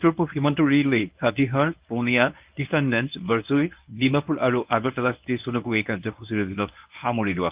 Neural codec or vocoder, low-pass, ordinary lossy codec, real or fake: codec, 24 kHz, 0.9 kbps, WavTokenizer, medium speech release version 1; 3.6 kHz; Opus, 32 kbps; fake